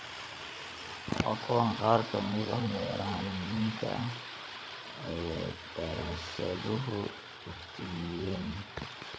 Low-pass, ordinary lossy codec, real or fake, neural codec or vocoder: none; none; fake; codec, 16 kHz, 8 kbps, FreqCodec, larger model